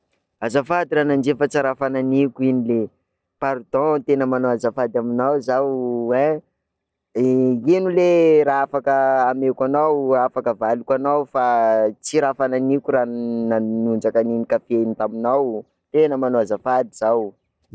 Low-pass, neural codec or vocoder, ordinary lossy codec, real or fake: none; none; none; real